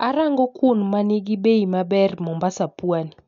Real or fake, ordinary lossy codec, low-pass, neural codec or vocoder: real; none; 7.2 kHz; none